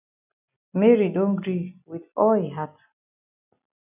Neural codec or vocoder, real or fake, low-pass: none; real; 3.6 kHz